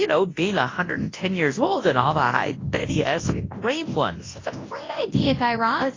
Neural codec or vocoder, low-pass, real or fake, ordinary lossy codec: codec, 24 kHz, 0.9 kbps, WavTokenizer, large speech release; 7.2 kHz; fake; AAC, 32 kbps